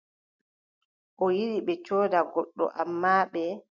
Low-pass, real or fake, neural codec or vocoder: 7.2 kHz; real; none